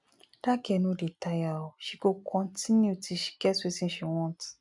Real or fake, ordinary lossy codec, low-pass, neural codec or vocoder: real; none; 10.8 kHz; none